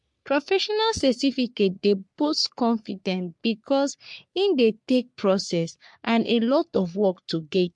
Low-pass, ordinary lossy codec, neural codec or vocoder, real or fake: 10.8 kHz; MP3, 64 kbps; codec, 44.1 kHz, 3.4 kbps, Pupu-Codec; fake